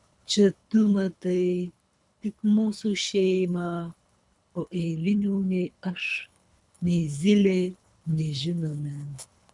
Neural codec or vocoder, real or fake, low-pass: codec, 24 kHz, 3 kbps, HILCodec; fake; 10.8 kHz